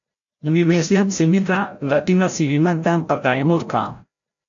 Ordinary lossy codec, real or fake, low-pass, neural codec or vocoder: AAC, 64 kbps; fake; 7.2 kHz; codec, 16 kHz, 0.5 kbps, FreqCodec, larger model